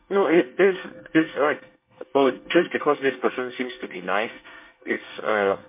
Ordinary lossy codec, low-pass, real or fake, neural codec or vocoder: MP3, 24 kbps; 3.6 kHz; fake; codec, 24 kHz, 1 kbps, SNAC